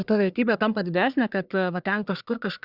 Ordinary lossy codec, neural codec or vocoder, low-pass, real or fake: Opus, 64 kbps; codec, 44.1 kHz, 1.7 kbps, Pupu-Codec; 5.4 kHz; fake